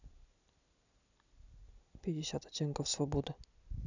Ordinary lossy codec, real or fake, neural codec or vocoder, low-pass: none; real; none; 7.2 kHz